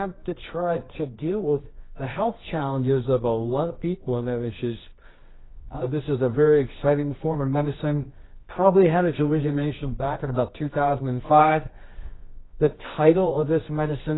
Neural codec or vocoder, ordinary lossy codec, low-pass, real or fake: codec, 24 kHz, 0.9 kbps, WavTokenizer, medium music audio release; AAC, 16 kbps; 7.2 kHz; fake